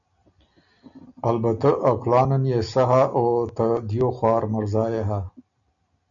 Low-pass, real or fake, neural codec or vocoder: 7.2 kHz; real; none